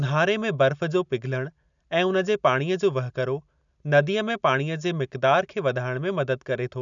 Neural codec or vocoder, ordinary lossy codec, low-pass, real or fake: none; none; 7.2 kHz; real